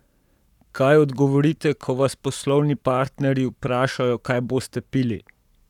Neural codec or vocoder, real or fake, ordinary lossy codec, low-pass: codec, 44.1 kHz, 7.8 kbps, Pupu-Codec; fake; none; 19.8 kHz